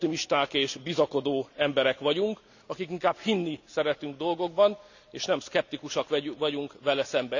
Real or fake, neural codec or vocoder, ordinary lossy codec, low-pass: real; none; none; 7.2 kHz